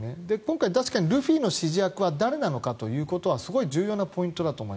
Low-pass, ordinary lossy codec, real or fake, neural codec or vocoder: none; none; real; none